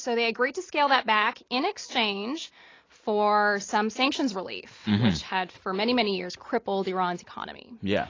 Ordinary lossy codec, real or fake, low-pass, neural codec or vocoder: AAC, 32 kbps; real; 7.2 kHz; none